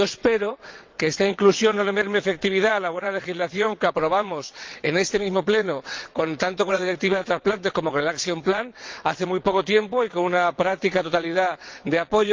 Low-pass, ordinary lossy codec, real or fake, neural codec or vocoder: 7.2 kHz; Opus, 24 kbps; fake; vocoder, 22.05 kHz, 80 mel bands, WaveNeXt